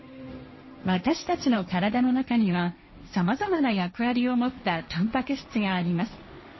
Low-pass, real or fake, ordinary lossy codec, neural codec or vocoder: 7.2 kHz; fake; MP3, 24 kbps; codec, 16 kHz, 1.1 kbps, Voila-Tokenizer